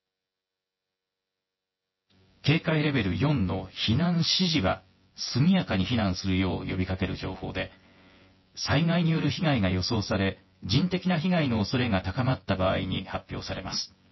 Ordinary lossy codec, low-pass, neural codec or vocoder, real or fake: MP3, 24 kbps; 7.2 kHz; vocoder, 24 kHz, 100 mel bands, Vocos; fake